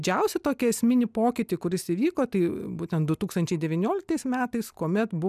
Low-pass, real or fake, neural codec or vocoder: 14.4 kHz; real; none